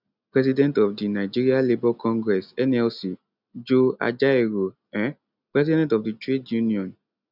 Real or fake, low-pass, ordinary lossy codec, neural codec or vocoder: real; 5.4 kHz; none; none